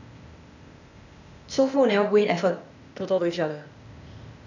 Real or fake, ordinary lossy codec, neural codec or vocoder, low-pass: fake; none; codec, 16 kHz, 0.8 kbps, ZipCodec; 7.2 kHz